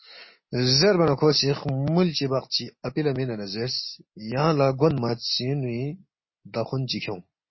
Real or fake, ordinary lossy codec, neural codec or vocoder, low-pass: real; MP3, 24 kbps; none; 7.2 kHz